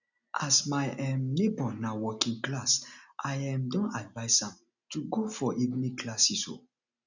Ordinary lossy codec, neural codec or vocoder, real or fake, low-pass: none; none; real; 7.2 kHz